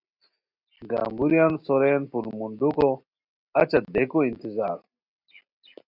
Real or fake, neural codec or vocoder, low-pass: real; none; 5.4 kHz